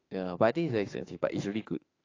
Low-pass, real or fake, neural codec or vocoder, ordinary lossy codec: 7.2 kHz; fake; autoencoder, 48 kHz, 32 numbers a frame, DAC-VAE, trained on Japanese speech; AAC, 32 kbps